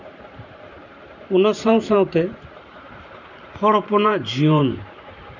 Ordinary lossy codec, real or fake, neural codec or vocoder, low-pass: none; fake; vocoder, 44.1 kHz, 128 mel bands every 512 samples, BigVGAN v2; 7.2 kHz